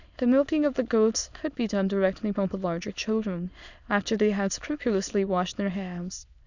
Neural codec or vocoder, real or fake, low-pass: autoencoder, 22.05 kHz, a latent of 192 numbers a frame, VITS, trained on many speakers; fake; 7.2 kHz